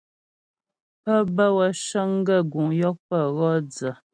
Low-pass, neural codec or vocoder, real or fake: 9.9 kHz; none; real